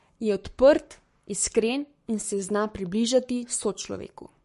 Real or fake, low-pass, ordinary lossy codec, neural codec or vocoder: fake; 14.4 kHz; MP3, 48 kbps; codec, 44.1 kHz, 7.8 kbps, Pupu-Codec